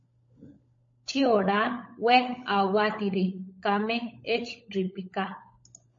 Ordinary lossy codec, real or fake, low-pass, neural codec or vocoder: MP3, 32 kbps; fake; 7.2 kHz; codec, 16 kHz, 16 kbps, FunCodec, trained on LibriTTS, 50 frames a second